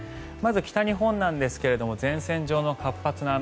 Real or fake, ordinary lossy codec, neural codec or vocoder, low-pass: real; none; none; none